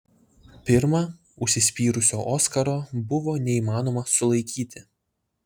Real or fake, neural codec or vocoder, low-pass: real; none; 19.8 kHz